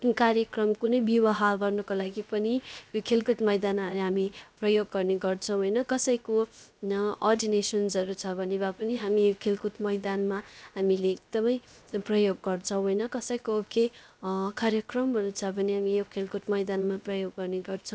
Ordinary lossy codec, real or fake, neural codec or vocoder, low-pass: none; fake; codec, 16 kHz, 0.7 kbps, FocalCodec; none